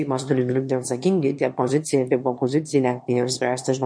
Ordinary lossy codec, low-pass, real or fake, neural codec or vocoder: MP3, 48 kbps; 9.9 kHz; fake; autoencoder, 22.05 kHz, a latent of 192 numbers a frame, VITS, trained on one speaker